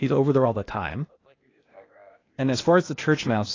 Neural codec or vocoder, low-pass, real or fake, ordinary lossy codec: codec, 16 kHz, 0.8 kbps, ZipCodec; 7.2 kHz; fake; AAC, 32 kbps